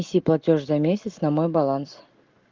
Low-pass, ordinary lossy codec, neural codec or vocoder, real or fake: 7.2 kHz; Opus, 32 kbps; none; real